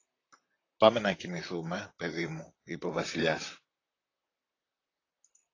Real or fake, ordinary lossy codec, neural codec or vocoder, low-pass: fake; AAC, 32 kbps; vocoder, 44.1 kHz, 128 mel bands, Pupu-Vocoder; 7.2 kHz